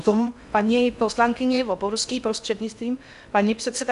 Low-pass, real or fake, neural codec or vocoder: 10.8 kHz; fake; codec, 16 kHz in and 24 kHz out, 0.6 kbps, FocalCodec, streaming, 2048 codes